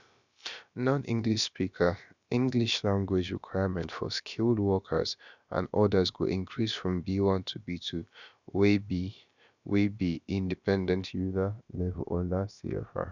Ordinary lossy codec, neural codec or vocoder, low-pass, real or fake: none; codec, 16 kHz, about 1 kbps, DyCAST, with the encoder's durations; 7.2 kHz; fake